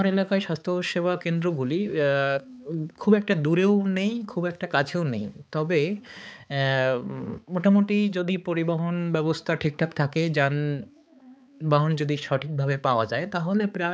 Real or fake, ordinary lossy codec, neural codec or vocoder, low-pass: fake; none; codec, 16 kHz, 4 kbps, X-Codec, HuBERT features, trained on balanced general audio; none